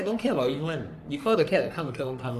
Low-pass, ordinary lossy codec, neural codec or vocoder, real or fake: 14.4 kHz; none; codec, 44.1 kHz, 3.4 kbps, Pupu-Codec; fake